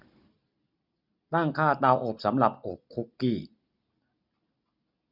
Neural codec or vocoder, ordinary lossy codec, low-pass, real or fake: none; none; 5.4 kHz; real